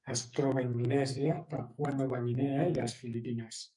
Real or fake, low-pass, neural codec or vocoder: fake; 10.8 kHz; codec, 44.1 kHz, 2.6 kbps, SNAC